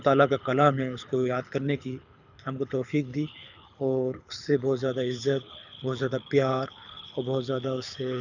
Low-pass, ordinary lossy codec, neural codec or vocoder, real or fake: 7.2 kHz; none; codec, 24 kHz, 6 kbps, HILCodec; fake